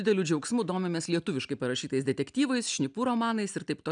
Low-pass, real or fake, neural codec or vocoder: 9.9 kHz; real; none